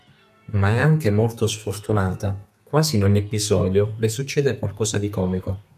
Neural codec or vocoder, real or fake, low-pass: codec, 32 kHz, 1.9 kbps, SNAC; fake; 14.4 kHz